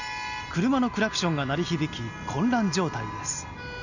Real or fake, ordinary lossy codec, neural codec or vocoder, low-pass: real; none; none; 7.2 kHz